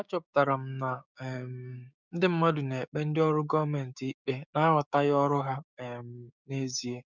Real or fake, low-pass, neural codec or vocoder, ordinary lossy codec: fake; 7.2 kHz; codec, 16 kHz, 6 kbps, DAC; none